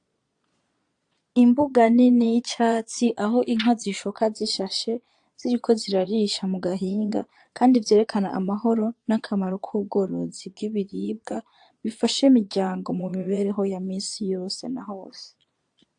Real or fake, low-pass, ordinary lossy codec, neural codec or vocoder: fake; 9.9 kHz; AAC, 64 kbps; vocoder, 22.05 kHz, 80 mel bands, Vocos